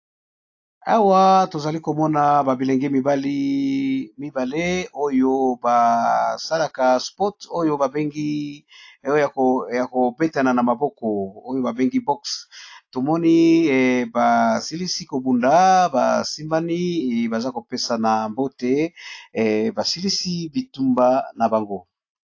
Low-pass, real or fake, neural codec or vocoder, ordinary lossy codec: 7.2 kHz; real; none; AAC, 48 kbps